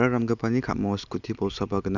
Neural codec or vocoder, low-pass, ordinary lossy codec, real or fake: none; 7.2 kHz; none; real